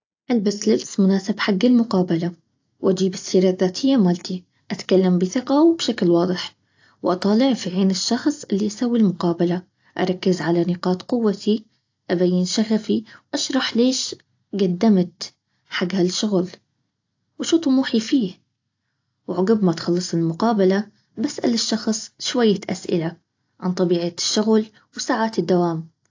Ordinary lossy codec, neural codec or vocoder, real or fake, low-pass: AAC, 48 kbps; none; real; 7.2 kHz